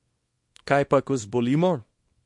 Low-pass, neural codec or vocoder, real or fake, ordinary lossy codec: 10.8 kHz; codec, 24 kHz, 0.9 kbps, WavTokenizer, small release; fake; MP3, 48 kbps